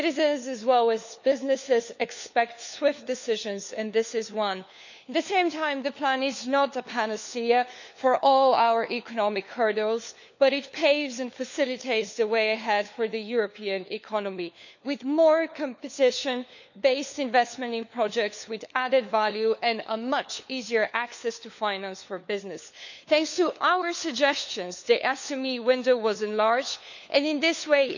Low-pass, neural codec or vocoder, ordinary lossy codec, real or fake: 7.2 kHz; codec, 16 kHz, 4 kbps, FunCodec, trained on LibriTTS, 50 frames a second; none; fake